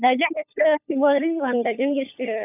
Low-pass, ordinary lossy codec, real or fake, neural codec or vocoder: 3.6 kHz; none; fake; codec, 16 kHz, 16 kbps, FunCodec, trained on LibriTTS, 50 frames a second